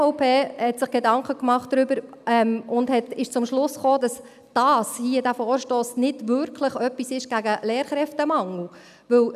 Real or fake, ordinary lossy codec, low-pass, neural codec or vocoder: real; none; 14.4 kHz; none